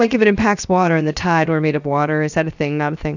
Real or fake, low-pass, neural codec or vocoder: fake; 7.2 kHz; codec, 16 kHz, 0.7 kbps, FocalCodec